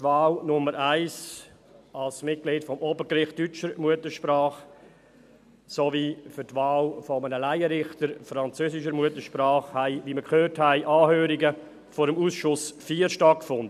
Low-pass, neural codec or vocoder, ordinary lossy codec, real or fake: 14.4 kHz; none; none; real